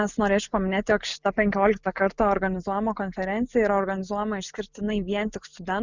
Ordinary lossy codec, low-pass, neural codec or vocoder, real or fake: Opus, 64 kbps; 7.2 kHz; none; real